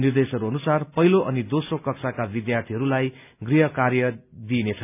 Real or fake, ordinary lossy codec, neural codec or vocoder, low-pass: real; none; none; 3.6 kHz